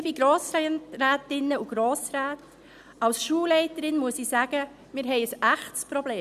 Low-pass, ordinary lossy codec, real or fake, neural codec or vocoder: 14.4 kHz; none; real; none